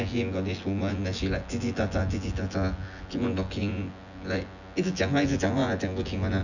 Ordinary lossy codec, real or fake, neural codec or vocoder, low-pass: none; fake; vocoder, 24 kHz, 100 mel bands, Vocos; 7.2 kHz